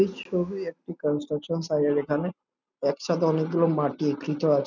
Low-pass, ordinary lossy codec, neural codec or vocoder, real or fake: 7.2 kHz; none; none; real